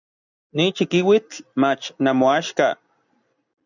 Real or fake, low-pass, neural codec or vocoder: real; 7.2 kHz; none